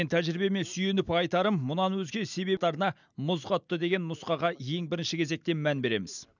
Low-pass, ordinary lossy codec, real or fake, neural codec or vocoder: 7.2 kHz; none; real; none